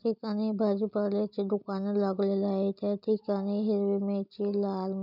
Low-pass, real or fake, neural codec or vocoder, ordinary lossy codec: 5.4 kHz; real; none; none